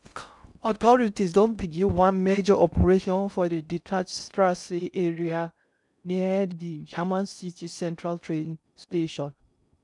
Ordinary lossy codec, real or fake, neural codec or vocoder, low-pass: none; fake; codec, 16 kHz in and 24 kHz out, 0.6 kbps, FocalCodec, streaming, 4096 codes; 10.8 kHz